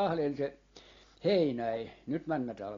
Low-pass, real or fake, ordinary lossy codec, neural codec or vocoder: 7.2 kHz; real; AAC, 32 kbps; none